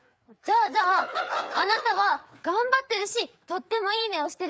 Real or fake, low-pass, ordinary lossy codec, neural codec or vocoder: fake; none; none; codec, 16 kHz, 4 kbps, FreqCodec, larger model